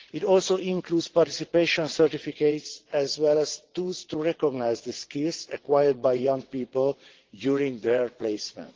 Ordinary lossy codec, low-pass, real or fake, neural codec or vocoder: Opus, 16 kbps; 7.2 kHz; fake; vocoder, 22.05 kHz, 80 mel bands, WaveNeXt